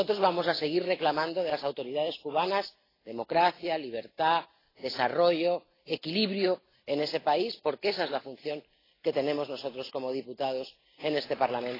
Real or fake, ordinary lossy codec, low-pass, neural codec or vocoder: real; AAC, 24 kbps; 5.4 kHz; none